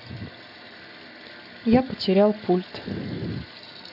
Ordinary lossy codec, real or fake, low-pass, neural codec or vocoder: none; real; 5.4 kHz; none